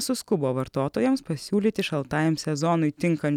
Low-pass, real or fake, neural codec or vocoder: 19.8 kHz; real; none